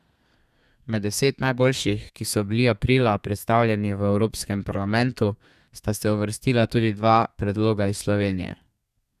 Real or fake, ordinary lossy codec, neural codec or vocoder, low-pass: fake; none; codec, 32 kHz, 1.9 kbps, SNAC; 14.4 kHz